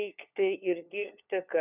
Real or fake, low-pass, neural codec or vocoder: fake; 3.6 kHz; codec, 16 kHz, 4 kbps, FunCodec, trained on LibriTTS, 50 frames a second